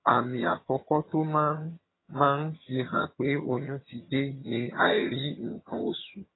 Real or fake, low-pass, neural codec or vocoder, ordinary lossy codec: fake; 7.2 kHz; vocoder, 22.05 kHz, 80 mel bands, HiFi-GAN; AAC, 16 kbps